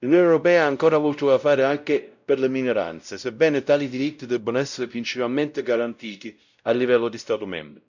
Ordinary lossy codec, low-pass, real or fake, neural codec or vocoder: none; 7.2 kHz; fake; codec, 16 kHz, 0.5 kbps, X-Codec, WavLM features, trained on Multilingual LibriSpeech